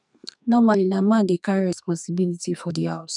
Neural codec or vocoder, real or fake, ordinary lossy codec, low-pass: codec, 44.1 kHz, 2.6 kbps, SNAC; fake; none; 10.8 kHz